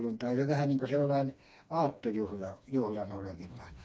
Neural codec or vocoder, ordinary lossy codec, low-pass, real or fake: codec, 16 kHz, 2 kbps, FreqCodec, smaller model; none; none; fake